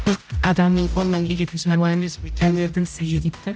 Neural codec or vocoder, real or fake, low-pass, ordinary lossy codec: codec, 16 kHz, 0.5 kbps, X-Codec, HuBERT features, trained on general audio; fake; none; none